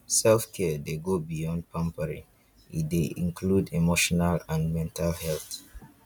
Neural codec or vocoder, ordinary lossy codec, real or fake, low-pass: none; none; real; 19.8 kHz